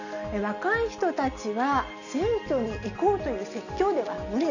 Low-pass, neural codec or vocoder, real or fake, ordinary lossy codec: 7.2 kHz; none; real; none